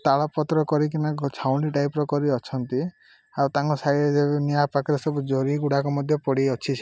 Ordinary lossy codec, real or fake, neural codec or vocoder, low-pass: none; real; none; none